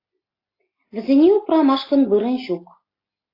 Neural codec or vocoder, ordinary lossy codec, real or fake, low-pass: none; AAC, 24 kbps; real; 5.4 kHz